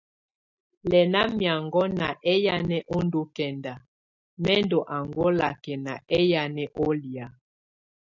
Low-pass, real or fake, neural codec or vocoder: 7.2 kHz; real; none